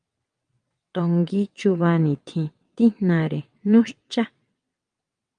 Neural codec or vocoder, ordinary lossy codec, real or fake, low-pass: vocoder, 22.05 kHz, 80 mel bands, WaveNeXt; Opus, 32 kbps; fake; 9.9 kHz